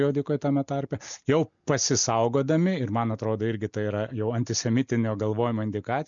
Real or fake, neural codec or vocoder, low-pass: real; none; 7.2 kHz